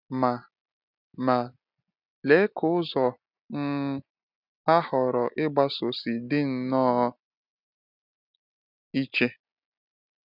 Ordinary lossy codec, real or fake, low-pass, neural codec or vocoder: none; real; 5.4 kHz; none